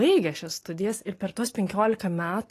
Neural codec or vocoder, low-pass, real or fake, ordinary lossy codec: none; 14.4 kHz; real; AAC, 48 kbps